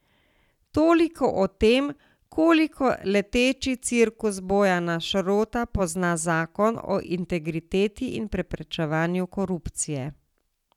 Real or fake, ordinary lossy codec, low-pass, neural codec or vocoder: real; none; 19.8 kHz; none